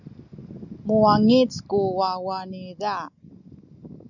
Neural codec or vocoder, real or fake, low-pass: none; real; 7.2 kHz